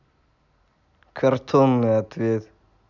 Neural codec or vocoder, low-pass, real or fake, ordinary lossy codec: none; 7.2 kHz; real; none